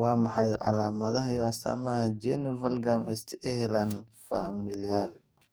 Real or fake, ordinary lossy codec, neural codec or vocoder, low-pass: fake; none; codec, 44.1 kHz, 2.6 kbps, DAC; none